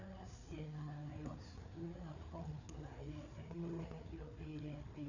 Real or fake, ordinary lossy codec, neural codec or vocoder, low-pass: fake; none; codec, 16 kHz, 4 kbps, FreqCodec, larger model; 7.2 kHz